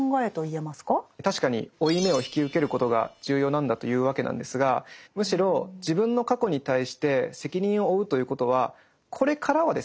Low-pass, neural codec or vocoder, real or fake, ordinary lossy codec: none; none; real; none